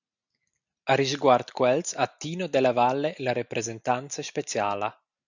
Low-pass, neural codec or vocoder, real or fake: 7.2 kHz; none; real